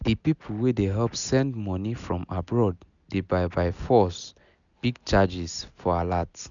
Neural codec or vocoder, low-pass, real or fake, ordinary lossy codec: none; 7.2 kHz; real; none